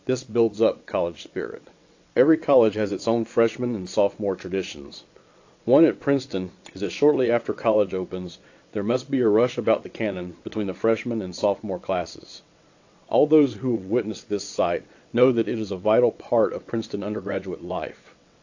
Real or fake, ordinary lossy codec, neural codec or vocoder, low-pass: fake; AAC, 48 kbps; vocoder, 22.05 kHz, 80 mel bands, WaveNeXt; 7.2 kHz